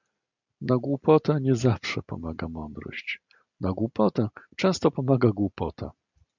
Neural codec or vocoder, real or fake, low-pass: none; real; 7.2 kHz